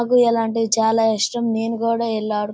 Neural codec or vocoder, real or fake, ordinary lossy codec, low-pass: none; real; none; none